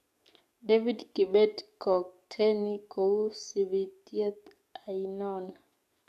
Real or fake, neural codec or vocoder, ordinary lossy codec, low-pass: fake; codec, 44.1 kHz, 7.8 kbps, DAC; AAC, 96 kbps; 14.4 kHz